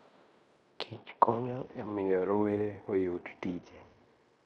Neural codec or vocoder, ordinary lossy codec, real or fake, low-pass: codec, 16 kHz in and 24 kHz out, 0.9 kbps, LongCat-Audio-Codec, fine tuned four codebook decoder; none; fake; 10.8 kHz